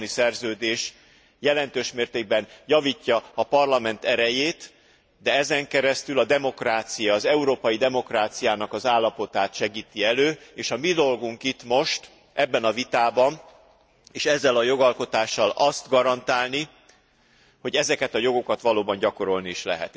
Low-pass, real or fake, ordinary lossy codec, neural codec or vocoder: none; real; none; none